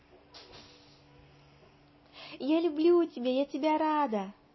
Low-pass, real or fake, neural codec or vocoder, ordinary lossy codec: 7.2 kHz; real; none; MP3, 24 kbps